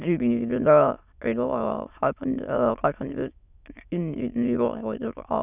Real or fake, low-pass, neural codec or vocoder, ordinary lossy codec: fake; 3.6 kHz; autoencoder, 22.05 kHz, a latent of 192 numbers a frame, VITS, trained on many speakers; none